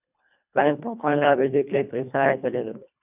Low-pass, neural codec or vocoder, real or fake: 3.6 kHz; codec, 24 kHz, 1.5 kbps, HILCodec; fake